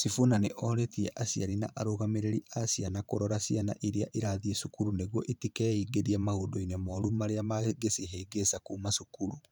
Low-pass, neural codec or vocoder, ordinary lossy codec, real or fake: none; vocoder, 44.1 kHz, 128 mel bands every 512 samples, BigVGAN v2; none; fake